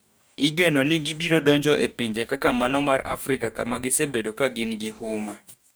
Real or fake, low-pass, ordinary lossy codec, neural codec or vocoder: fake; none; none; codec, 44.1 kHz, 2.6 kbps, DAC